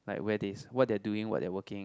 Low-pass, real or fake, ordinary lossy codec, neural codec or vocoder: none; real; none; none